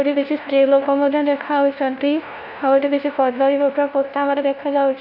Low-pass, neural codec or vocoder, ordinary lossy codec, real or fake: 5.4 kHz; codec, 16 kHz, 1 kbps, FunCodec, trained on LibriTTS, 50 frames a second; none; fake